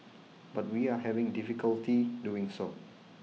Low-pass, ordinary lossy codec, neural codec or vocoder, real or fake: none; none; none; real